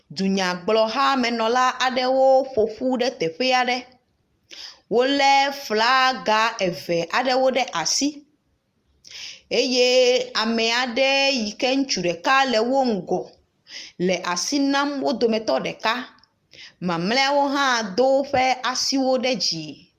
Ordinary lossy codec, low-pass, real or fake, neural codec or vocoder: Opus, 32 kbps; 14.4 kHz; real; none